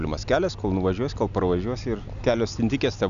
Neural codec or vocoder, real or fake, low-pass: none; real; 7.2 kHz